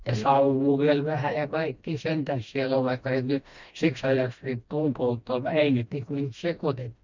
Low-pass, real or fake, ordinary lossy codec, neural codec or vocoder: 7.2 kHz; fake; MP3, 64 kbps; codec, 16 kHz, 1 kbps, FreqCodec, smaller model